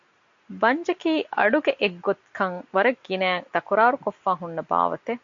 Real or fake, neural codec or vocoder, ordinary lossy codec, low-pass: real; none; AAC, 64 kbps; 7.2 kHz